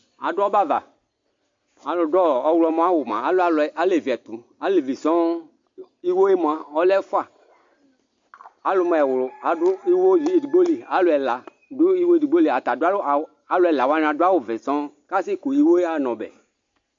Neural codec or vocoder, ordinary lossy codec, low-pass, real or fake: none; MP3, 48 kbps; 7.2 kHz; real